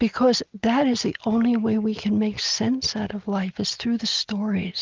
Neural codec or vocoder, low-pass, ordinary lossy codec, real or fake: none; 7.2 kHz; Opus, 24 kbps; real